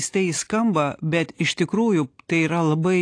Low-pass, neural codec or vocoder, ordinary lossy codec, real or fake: 9.9 kHz; none; AAC, 48 kbps; real